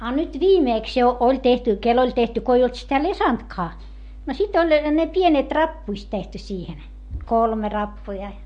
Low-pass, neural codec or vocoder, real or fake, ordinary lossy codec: 10.8 kHz; none; real; MP3, 48 kbps